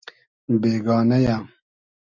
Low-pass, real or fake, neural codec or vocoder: 7.2 kHz; real; none